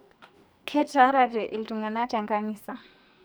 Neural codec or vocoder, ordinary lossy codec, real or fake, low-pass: codec, 44.1 kHz, 2.6 kbps, SNAC; none; fake; none